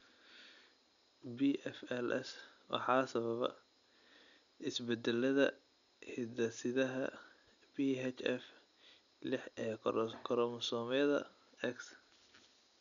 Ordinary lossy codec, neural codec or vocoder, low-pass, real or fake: none; none; 7.2 kHz; real